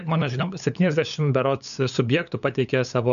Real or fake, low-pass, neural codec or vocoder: fake; 7.2 kHz; codec, 16 kHz, 8 kbps, FunCodec, trained on LibriTTS, 25 frames a second